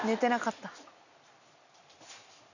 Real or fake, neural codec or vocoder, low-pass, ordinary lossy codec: real; none; 7.2 kHz; none